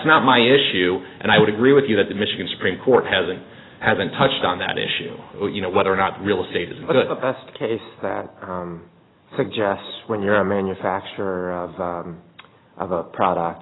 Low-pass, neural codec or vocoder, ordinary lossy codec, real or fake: 7.2 kHz; none; AAC, 16 kbps; real